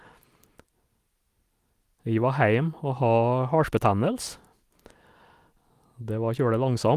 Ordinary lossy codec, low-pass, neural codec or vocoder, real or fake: Opus, 32 kbps; 14.4 kHz; none; real